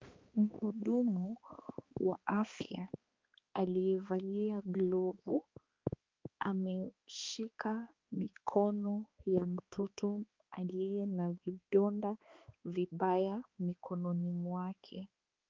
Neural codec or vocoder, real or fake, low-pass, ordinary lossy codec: codec, 16 kHz, 2 kbps, X-Codec, HuBERT features, trained on balanced general audio; fake; 7.2 kHz; Opus, 32 kbps